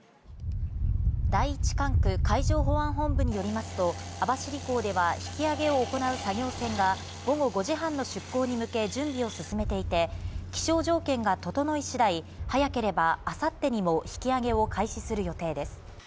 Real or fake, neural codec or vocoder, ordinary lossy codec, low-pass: real; none; none; none